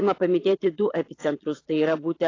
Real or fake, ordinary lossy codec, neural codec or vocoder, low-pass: fake; AAC, 32 kbps; vocoder, 22.05 kHz, 80 mel bands, Vocos; 7.2 kHz